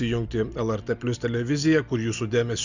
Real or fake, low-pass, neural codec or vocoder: real; 7.2 kHz; none